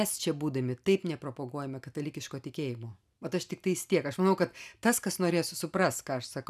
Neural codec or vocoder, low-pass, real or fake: none; 14.4 kHz; real